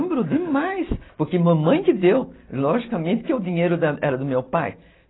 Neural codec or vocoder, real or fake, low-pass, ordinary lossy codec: none; real; 7.2 kHz; AAC, 16 kbps